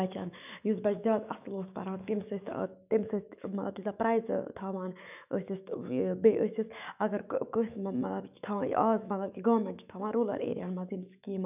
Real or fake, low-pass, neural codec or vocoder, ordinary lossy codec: fake; 3.6 kHz; autoencoder, 48 kHz, 128 numbers a frame, DAC-VAE, trained on Japanese speech; none